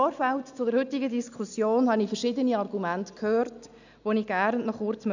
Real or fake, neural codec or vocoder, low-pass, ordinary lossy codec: real; none; 7.2 kHz; none